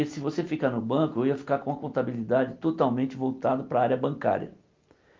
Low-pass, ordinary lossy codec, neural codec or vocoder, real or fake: 7.2 kHz; Opus, 32 kbps; none; real